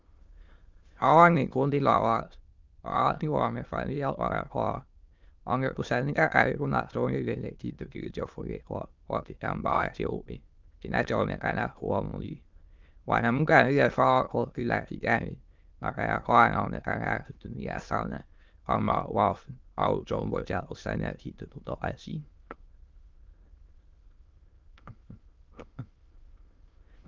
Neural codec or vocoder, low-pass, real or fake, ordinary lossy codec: autoencoder, 22.05 kHz, a latent of 192 numbers a frame, VITS, trained on many speakers; 7.2 kHz; fake; Opus, 32 kbps